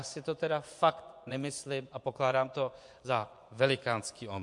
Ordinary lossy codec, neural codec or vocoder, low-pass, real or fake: MP3, 64 kbps; vocoder, 24 kHz, 100 mel bands, Vocos; 10.8 kHz; fake